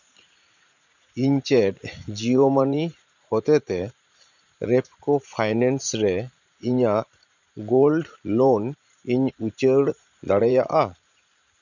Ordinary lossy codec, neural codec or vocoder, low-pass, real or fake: none; vocoder, 44.1 kHz, 80 mel bands, Vocos; 7.2 kHz; fake